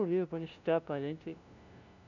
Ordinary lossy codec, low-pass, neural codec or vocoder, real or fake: none; 7.2 kHz; codec, 16 kHz, 0.5 kbps, FunCodec, trained on LibriTTS, 25 frames a second; fake